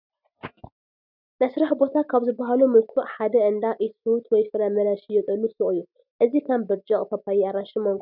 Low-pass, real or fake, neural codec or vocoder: 5.4 kHz; real; none